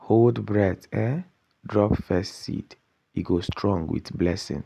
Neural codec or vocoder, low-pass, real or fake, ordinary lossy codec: none; 14.4 kHz; real; none